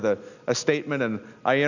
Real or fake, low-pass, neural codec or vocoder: real; 7.2 kHz; none